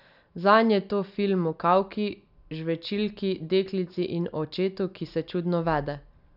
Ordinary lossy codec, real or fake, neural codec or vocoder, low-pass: none; real; none; 5.4 kHz